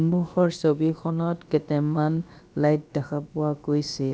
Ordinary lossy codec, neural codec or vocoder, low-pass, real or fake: none; codec, 16 kHz, about 1 kbps, DyCAST, with the encoder's durations; none; fake